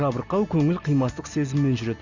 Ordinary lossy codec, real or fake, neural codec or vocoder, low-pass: Opus, 64 kbps; real; none; 7.2 kHz